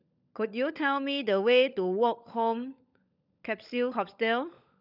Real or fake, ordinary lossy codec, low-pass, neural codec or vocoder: fake; none; 5.4 kHz; codec, 16 kHz, 16 kbps, FunCodec, trained on LibriTTS, 50 frames a second